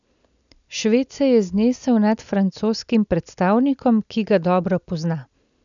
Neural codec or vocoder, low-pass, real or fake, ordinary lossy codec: none; 7.2 kHz; real; none